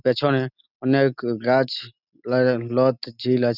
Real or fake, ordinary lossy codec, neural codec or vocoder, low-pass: real; none; none; 5.4 kHz